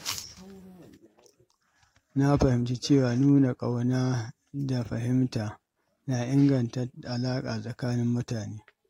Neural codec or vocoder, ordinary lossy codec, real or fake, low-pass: none; AAC, 48 kbps; real; 19.8 kHz